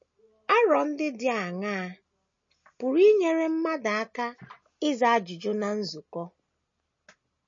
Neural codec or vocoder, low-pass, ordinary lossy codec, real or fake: none; 7.2 kHz; MP3, 32 kbps; real